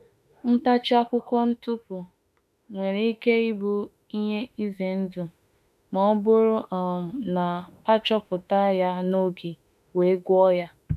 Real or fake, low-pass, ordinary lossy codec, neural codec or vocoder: fake; 14.4 kHz; none; autoencoder, 48 kHz, 32 numbers a frame, DAC-VAE, trained on Japanese speech